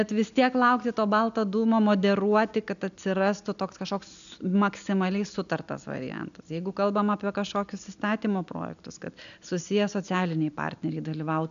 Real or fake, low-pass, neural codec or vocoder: real; 7.2 kHz; none